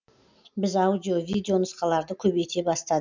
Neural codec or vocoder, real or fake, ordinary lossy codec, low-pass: none; real; MP3, 64 kbps; 7.2 kHz